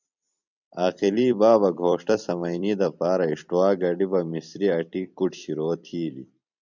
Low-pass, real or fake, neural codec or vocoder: 7.2 kHz; fake; vocoder, 44.1 kHz, 128 mel bands every 256 samples, BigVGAN v2